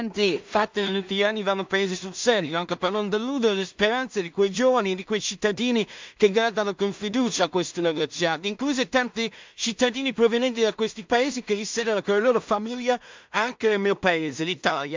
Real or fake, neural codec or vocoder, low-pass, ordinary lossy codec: fake; codec, 16 kHz in and 24 kHz out, 0.4 kbps, LongCat-Audio-Codec, two codebook decoder; 7.2 kHz; MP3, 64 kbps